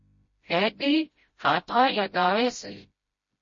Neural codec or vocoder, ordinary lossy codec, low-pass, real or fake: codec, 16 kHz, 0.5 kbps, FreqCodec, smaller model; MP3, 32 kbps; 7.2 kHz; fake